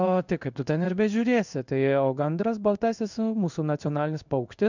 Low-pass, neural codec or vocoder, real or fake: 7.2 kHz; codec, 16 kHz in and 24 kHz out, 1 kbps, XY-Tokenizer; fake